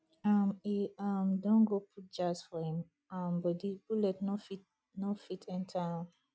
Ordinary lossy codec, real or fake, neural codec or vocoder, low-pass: none; real; none; none